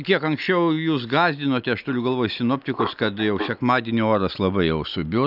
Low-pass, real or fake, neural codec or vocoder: 5.4 kHz; real; none